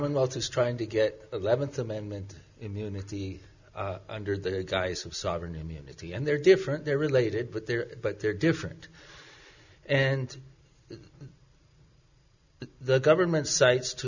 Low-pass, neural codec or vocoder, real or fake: 7.2 kHz; none; real